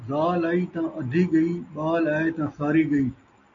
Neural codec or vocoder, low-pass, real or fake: none; 7.2 kHz; real